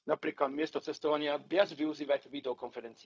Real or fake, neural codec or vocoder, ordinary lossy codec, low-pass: fake; codec, 16 kHz, 0.4 kbps, LongCat-Audio-Codec; none; none